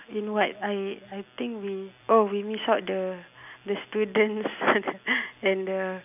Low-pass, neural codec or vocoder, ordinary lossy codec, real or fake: 3.6 kHz; none; none; real